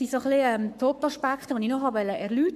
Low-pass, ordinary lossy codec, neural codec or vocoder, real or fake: 14.4 kHz; MP3, 96 kbps; codec, 44.1 kHz, 7.8 kbps, Pupu-Codec; fake